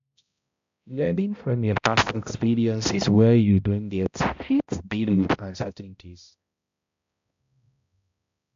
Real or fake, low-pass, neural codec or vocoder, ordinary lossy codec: fake; 7.2 kHz; codec, 16 kHz, 0.5 kbps, X-Codec, HuBERT features, trained on balanced general audio; AAC, 64 kbps